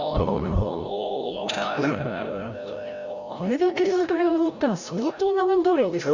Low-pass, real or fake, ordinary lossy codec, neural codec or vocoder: 7.2 kHz; fake; none; codec, 16 kHz, 0.5 kbps, FreqCodec, larger model